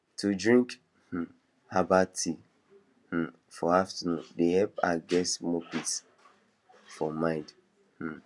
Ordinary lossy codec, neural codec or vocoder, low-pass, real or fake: none; none; none; real